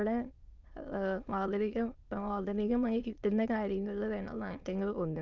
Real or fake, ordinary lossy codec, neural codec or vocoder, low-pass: fake; Opus, 32 kbps; autoencoder, 22.05 kHz, a latent of 192 numbers a frame, VITS, trained on many speakers; 7.2 kHz